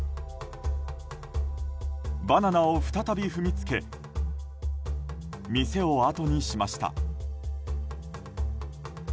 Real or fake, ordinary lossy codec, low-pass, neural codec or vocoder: real; none; none; none